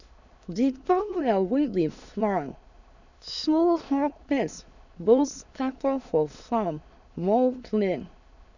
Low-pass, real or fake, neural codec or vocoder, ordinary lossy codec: 7.2 kHz; fake; autoencoder, 22.05 kHz, a latent of 192 numbers a frame, VITS, trained on many speakers; none